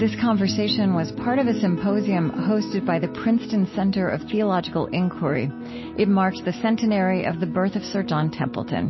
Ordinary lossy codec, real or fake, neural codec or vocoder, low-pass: MP3, 24 kbps; real; none; 7.2 kHz